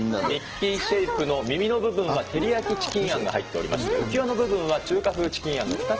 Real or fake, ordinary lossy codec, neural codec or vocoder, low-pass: real; Opus, 16 kbps; none; 7.2 kHz